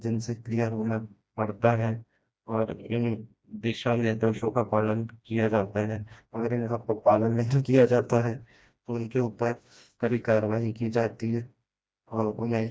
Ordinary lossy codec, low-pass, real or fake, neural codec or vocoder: none; none; fake; codec, 16 kHz, 1 kbps, FreqCodec, smaller model